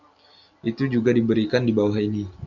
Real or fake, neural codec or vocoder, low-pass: real; none; 7.2 kHz